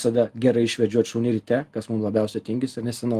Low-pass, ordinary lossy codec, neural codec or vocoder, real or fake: 14.4 kHz; Opus, 24 kbps; none; real